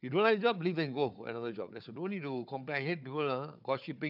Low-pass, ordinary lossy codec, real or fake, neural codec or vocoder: 5.4 kHz; none; fake; codec, 16 kHz, 4 kbps, FunCodec, trained on Chinese and English, 50 frames a second